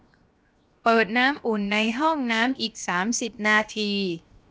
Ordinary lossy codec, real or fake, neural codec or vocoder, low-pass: none; fake; codec, 16 kHz, 0.7 kbps, FocalCodec; none